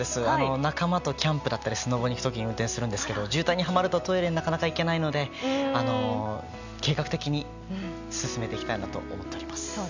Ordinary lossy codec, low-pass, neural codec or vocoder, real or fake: none; 7.2 kHz; none; real